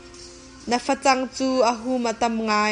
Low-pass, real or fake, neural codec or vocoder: 10.8 kHz; real; none